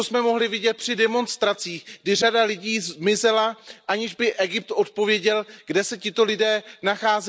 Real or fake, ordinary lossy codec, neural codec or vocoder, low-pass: real; none; none; none